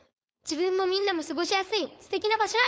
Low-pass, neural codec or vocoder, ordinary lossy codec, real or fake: none; codec, 16 kHz, 4.8 kbps, FACodec; none; fake